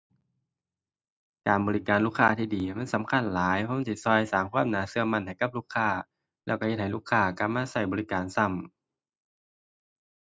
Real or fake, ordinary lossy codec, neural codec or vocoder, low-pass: real; none; none; none